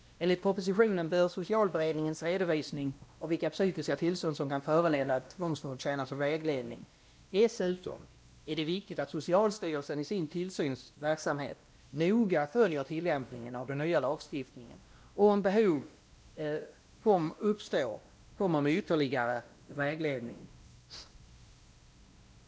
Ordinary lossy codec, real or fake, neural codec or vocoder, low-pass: none; fake; codec, 16 kHz, 1 kbps, X-Codec, WavLM features, trained on Multilingual LibriSpeech; none